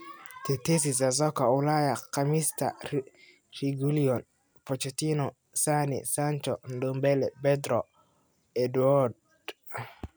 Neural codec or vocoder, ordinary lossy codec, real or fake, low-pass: none; none; real; none